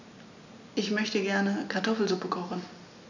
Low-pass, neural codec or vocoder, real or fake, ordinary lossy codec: 7.2 kHz; none; real; none